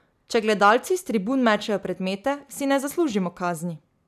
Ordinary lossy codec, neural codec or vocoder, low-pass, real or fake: none; none; 14.4 kHz; real